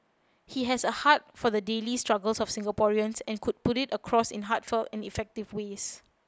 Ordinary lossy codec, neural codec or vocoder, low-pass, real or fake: none; none; none; real